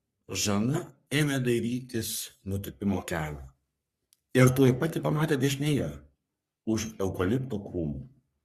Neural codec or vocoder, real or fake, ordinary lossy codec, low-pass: codec, 44.1 kHz, 3.4 kbps, Pupu-Codec; fake; Opus, 64 kbps; 14.4 kHz